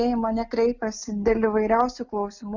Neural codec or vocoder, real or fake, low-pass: none; real; 7.2 kHz